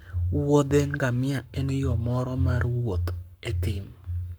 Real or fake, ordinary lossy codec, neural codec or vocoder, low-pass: fake; none; codec, 44.1 kHz, 7.8 kbps, Pupu-Codec; none